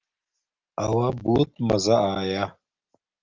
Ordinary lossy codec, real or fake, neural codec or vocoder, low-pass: Opus, 24 kbps; real; none; 7.2 kHz